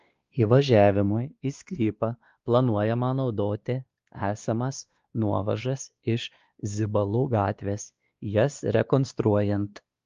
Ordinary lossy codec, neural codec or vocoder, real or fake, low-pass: Opus, 32 kbps; codec, 16 kHz, 2 kbps, X-Codec, WavLM features, trained on Multilingual LibriSpeech; fake; 7.2 kHz